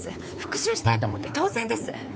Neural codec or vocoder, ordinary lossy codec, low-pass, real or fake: codec, 16 kHz, 4 kbps, X-Codec, WavLM features, trained on Multilingual LibriSpeech; none; none; fake